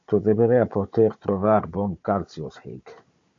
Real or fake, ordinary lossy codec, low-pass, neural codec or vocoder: fake; AAC, 48 kbps; 7.2 kHz; codec, 16 kHz, 16 kbps, FunCodec, trained on Chinese and English, 50 frames a second